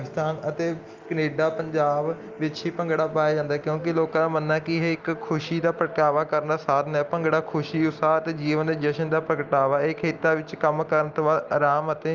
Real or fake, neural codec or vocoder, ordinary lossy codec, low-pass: real; none; Opus, 32 kbps; 7.2 kHz